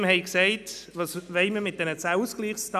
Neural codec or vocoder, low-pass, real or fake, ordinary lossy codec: none; 14.4 kHz; real; none